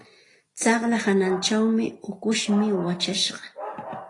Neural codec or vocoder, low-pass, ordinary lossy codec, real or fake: none; 10.8 kHz; AAC, 32 kbps; real